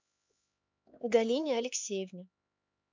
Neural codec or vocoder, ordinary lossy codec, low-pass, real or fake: codec, 16 kHz, 4 kbps, X-Codec, HuBERT features, trained on LibriSpeech; MP3, 64 kbps; 7.2 kHz; fake